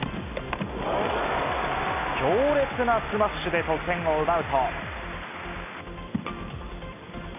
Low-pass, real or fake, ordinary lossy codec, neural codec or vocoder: 3.6 kHz; real; none; none